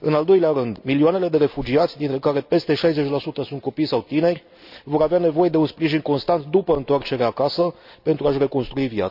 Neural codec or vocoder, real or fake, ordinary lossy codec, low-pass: none; real; none; 5.4 kHz